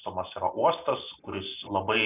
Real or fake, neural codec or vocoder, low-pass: real; none; 3.6 kHz